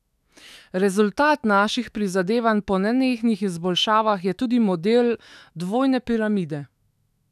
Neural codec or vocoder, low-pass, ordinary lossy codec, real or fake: autoencoder, 48 kHz, 128 numbers a frame, DAC-VAE, trained on Japanese speech; 14.4 kHz; none; fake